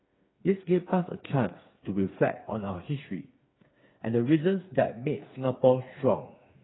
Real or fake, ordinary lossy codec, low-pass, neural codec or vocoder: fake; AAC, 16 kbps; 7.2 kHz; codec, 16 kHz, 4 kbps, FreqCodec, smaller model